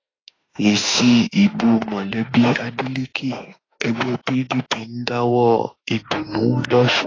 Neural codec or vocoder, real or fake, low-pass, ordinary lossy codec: autoencoder, 48 kHz, 32 numbers a frame, DAC-VAE, trained on Japanese speech; fake; 7.2 kHz; AAC, 32 kbps